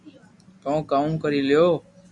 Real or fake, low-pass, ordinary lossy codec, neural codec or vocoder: real; 10.8 kHz; MP3, 96 kbps; none